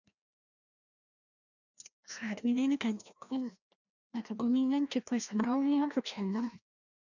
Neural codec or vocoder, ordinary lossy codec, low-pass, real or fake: codec, 16 kHz, 1 kbps, FreqCodec, larger model; none; 7.2 kHz; fake